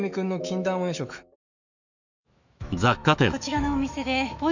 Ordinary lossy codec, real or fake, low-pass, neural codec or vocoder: none; fake; 7.2 kHz; autoencoder, 48 kHz, 128 numbers a frame, DAC-VAE, trained on Japanese speech